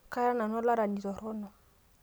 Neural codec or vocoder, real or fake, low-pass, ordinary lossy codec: none; real; none; none